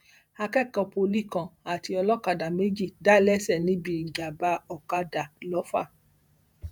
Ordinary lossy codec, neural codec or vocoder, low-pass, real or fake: none; vocoder, 48 kHz, 128 mel bands, Vocos; none; fake